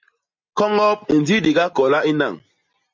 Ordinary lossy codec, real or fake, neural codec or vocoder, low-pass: MP3, 48 kbps; real; none; 7.2 kHz